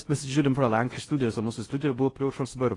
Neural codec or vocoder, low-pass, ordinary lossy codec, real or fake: codec, 16 kHz in and 24 kHz out, 0.6 kbps, FocalCodec, streaming, 4096 codes; 10.8 kHz; AAC, 32 kbps; fake